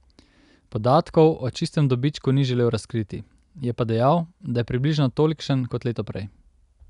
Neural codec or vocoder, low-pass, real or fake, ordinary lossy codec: none; 10.8 kHz; real; none